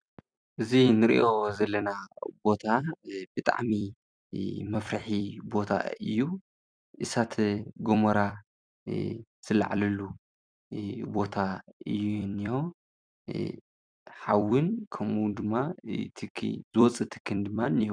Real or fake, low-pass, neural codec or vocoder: fake; 9.9 kHz; vocoder, 44.1 kHz, 128 mel bands every 256 samples, BigVGAN v2